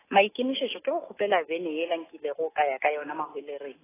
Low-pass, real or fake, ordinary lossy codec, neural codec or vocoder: 3.6 kHz; real; AAC, 16 kbps; none